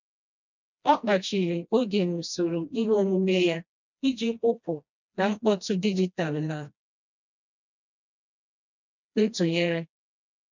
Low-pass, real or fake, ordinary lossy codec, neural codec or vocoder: 7.2 kHz; fake; none; codec, 16 kHz, 1 kbps, FreqCodec, smaller model